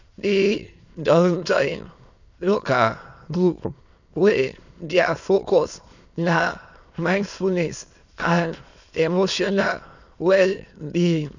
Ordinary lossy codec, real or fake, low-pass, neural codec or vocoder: none; fake; 7.2 kHz; autoencoder, 22.05 kHz, a latent of 192 numbers a frame, VITS, trained on many speakers